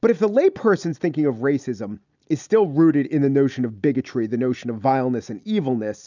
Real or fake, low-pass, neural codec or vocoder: real; 7.2 kHz; none